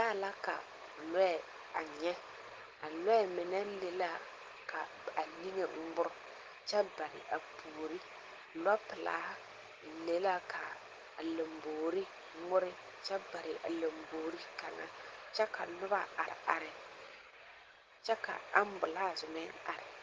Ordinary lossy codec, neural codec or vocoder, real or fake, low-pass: Opus, 16 kbps; none; real; 7.2 kHz